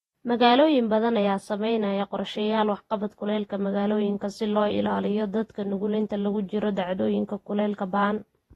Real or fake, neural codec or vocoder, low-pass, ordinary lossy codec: fake; vocoder, 48 kHz, 128 mel bands, Vocos; 19.8 kHz; AAC, 32 kbps